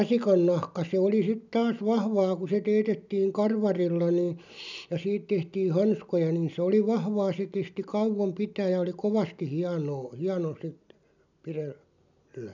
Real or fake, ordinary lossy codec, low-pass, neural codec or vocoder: real; none; 7.2 kHz; none